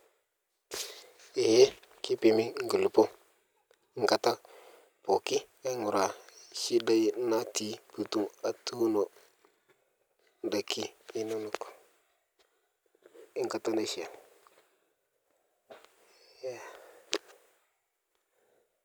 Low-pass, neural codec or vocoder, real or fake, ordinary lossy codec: none; none; real; none